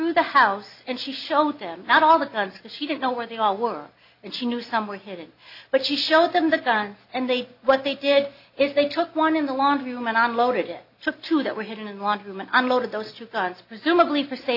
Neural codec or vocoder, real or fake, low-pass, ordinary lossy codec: none; real; 5.4 kHz; AAC, 48 kbps